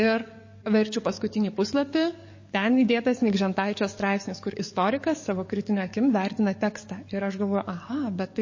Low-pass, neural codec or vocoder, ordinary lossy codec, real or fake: 7.2 kHz; codec, 44.1 kHz, 7.8 kbps, DAC; MP3, 32 kbps; fake